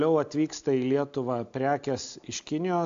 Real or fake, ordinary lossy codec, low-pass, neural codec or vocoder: real; AAC, 64 kbps; 7.2 kHz; none